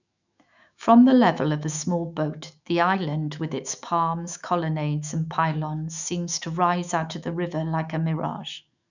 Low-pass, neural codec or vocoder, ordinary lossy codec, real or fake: 7.2 kHz; autoencoder, 48 kHz, 128 numbers a frame, DAC-VAE, trained on Japanese speech; none; fake